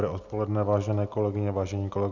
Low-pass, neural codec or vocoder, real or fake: 7.2 kHz; none; real